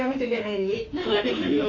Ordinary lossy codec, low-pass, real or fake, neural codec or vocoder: MP3, 48 kbps; 7.2 kHz; fake; autoencoder, 48 kHz, 32 numbers a frame, DAC-VAE, trained on Japanese speech